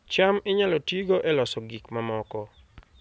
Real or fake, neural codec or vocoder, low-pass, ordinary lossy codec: real; none; none; none